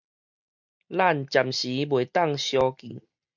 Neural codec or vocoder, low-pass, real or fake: none; 7.2 kHz; real